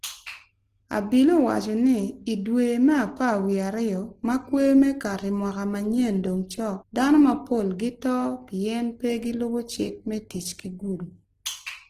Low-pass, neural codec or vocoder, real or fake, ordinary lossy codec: 14.4 kHz; none; real; Opus, 16 kbps